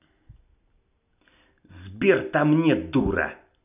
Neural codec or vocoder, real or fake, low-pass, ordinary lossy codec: none; real; 3.6 kHz; none